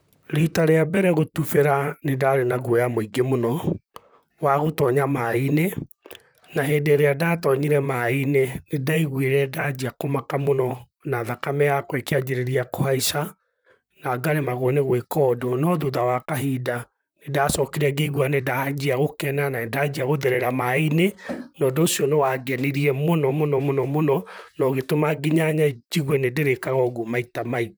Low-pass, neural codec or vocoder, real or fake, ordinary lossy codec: none; vocoder, 44.1 kHz, 128 mel bands, Pupu-Vocoder; fake; none